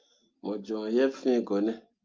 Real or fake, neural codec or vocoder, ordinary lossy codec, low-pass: real; none; Opus, 24 kbps; 7.2 kHz